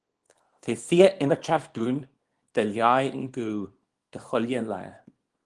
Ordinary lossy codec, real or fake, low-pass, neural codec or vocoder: Opus, 24 kbps; fake; 10.8 kHz; codec, 24 kHz, 0.9 kbps, WavTokenizer, small release